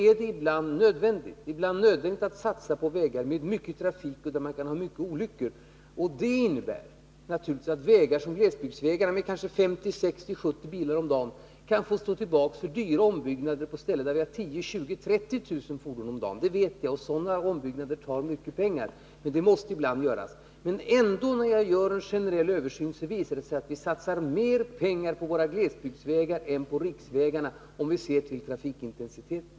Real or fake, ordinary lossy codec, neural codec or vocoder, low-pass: real; none; none; none